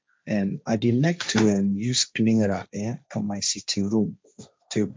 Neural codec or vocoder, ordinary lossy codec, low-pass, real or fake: codec, 16 kHz, 1.1 kbps, Voila-Tokenizer; none; none; fake